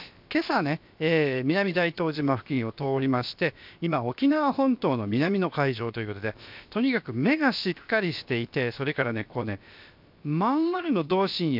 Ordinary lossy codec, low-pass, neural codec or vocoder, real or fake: MP3, 48 kbps; 5.4 kHz; codec, 16 kHz, about 1 kbps, DyCAST, with the encoder's durations; fake